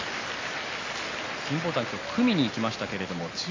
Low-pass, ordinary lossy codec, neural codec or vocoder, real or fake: 7.2 kHz; AAC, 32 kbps; none; real